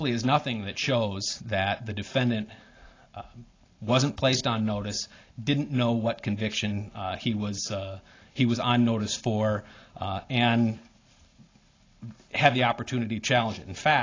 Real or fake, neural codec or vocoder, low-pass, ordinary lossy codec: real; none; 7.2 kHz; AAC, 32 kbps